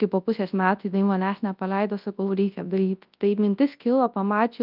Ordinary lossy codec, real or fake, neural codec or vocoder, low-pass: Opus, 24 kbps; fake; codec, 24 kHz, 0.9 kbps, WavTokenizer, large speech release; 5.4 kHz